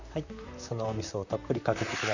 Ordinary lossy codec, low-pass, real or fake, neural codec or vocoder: none; 7.2 kHz; fake; vocoder, 44.1 kHz, 128 mel bands, Pupu-Vocoder